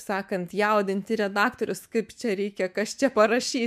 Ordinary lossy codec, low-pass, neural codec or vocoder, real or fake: MP3, 96 kbps; 14.4 kHz; autoencoder, 48 kHz, 128 numbers a frame, DAC-VAE, trained on Japanese speech; fake